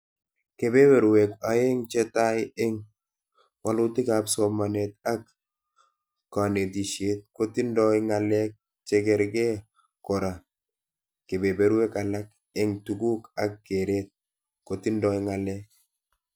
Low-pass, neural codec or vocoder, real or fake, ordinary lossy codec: none; none; real; none